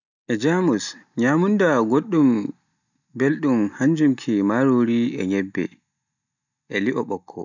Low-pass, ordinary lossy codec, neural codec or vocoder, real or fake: 7.2 kHz; none; none; real